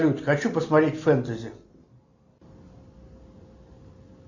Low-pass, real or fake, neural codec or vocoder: 7.2 kHz; real; none